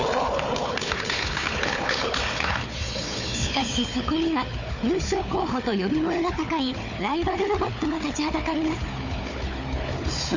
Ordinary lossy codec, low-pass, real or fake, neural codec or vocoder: none; 7.2 kHz; fake; codec, 16 kHz, 4 kbps, FunCodec, trained on Chinese and English, 50 frames a second